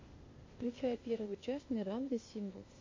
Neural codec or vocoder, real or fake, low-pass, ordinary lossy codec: codec, 16 kHz, 0.8 kbps, ZipCodec; fake; 7.2 kHz; MP3, 32 kbps